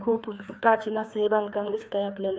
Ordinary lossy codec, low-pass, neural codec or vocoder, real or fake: none; none; codec, 16 kHz, 2 kbps, FreqCodec, larger model; fake